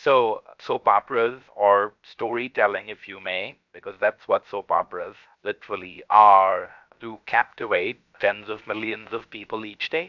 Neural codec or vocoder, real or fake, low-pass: codec, 16 kHz, about 1 kbps, DyCAST, with the encoder's durations; fake; 7.2 kHz